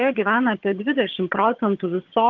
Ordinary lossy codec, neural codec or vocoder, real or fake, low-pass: Opus, 16 kbps; vocoder, 22.05 kHz, 80 mel bands, HiFi-GAN; fake; 7.2 kHz